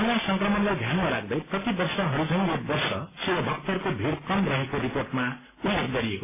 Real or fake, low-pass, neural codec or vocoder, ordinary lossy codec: real; 3.6 kHz; none; MP3, 16 kbps